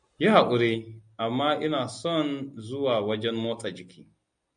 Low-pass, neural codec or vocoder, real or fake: 9.9 kHz; none; real